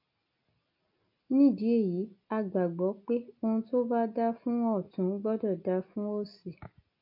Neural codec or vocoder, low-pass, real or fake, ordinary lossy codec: none; 5.4 kHz; real; MP3, 32 kbps